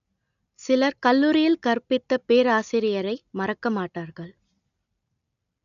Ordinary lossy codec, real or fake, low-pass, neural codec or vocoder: none; real; 7.2 kHz; none